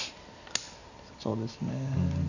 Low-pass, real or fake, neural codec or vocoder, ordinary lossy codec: 7.2 kHz; real; none; none